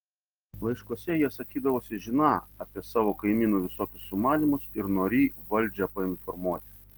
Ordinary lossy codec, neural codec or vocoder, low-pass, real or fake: Opus, 16 kbps; none; 19.8 kHz; real